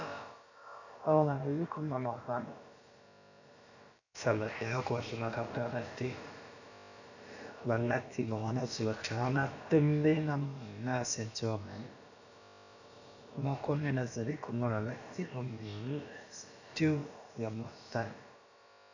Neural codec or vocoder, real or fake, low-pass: codec, 16 kHz, about 1 kbps, DyCAST, with the encoder's durations; fake; 7.2 kHz